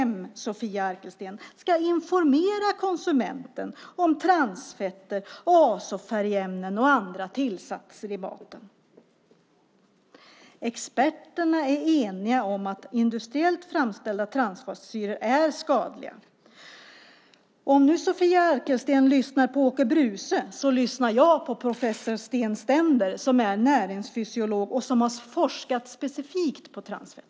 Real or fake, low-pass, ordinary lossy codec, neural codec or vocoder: real; none; none; none